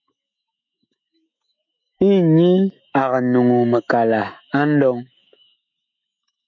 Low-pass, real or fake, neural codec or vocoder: 7.2 kHz; fake; autoencoder, 48 kHz, 128 numbers a frame, DAC-VAE, trained on Japanese speech